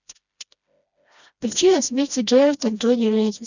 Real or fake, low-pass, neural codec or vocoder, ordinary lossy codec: fake; 7.2 kHz; codec, 16 kHz, 1 kbps, FreqCodec, smaller model; none